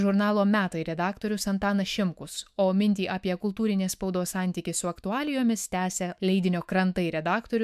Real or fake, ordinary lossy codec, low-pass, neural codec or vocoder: fake; MP3, 96 kbps; 14.4 kHz; autoencoder, 48 kHz, 128 numbers a frame, DAC-VAE, trained on Japanese speech